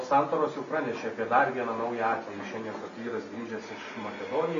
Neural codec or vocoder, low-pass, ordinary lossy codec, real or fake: none; 7.2 kHz; AAC, 48 kbps; real